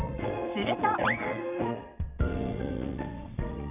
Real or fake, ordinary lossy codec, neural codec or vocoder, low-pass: fake; none; vocoder, 22.05 kHz, 80 mel bands, WaveNeXt; 3.6 kHz